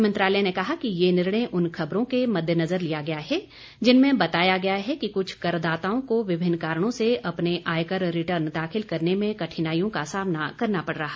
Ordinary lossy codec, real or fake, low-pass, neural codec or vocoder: none; real; none; none